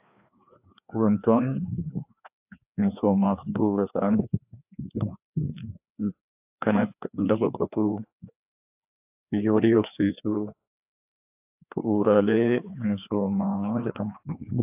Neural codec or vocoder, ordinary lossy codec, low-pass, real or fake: codec, 16 kHz, 2 kbps, FreqCodec, larger model; AAC, 32 kbps; 3.6 kHz; fake